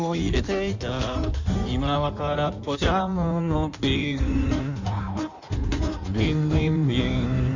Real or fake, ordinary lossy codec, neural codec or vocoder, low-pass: fake; none; codec, 16 kHz in and 24 kHz out, 1.1 kbps, FireRedTTS-2 codec; 7.2 kHz